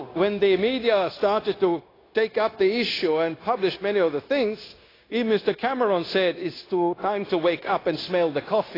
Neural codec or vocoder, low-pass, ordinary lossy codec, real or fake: codec, 16 kHz, 0.9 kbps, LongCat-Audio-Codec; 5.4 kHz; AAC, 24 kbps; fake